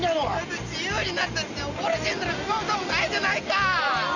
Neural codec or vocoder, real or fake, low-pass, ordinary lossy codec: none; real; 7.2 kHz; none